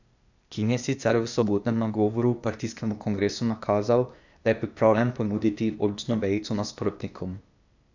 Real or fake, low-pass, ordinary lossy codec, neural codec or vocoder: fake; 7.2 kHz; none; codec, 16 kHz, 0.8 kbps, ZipCodec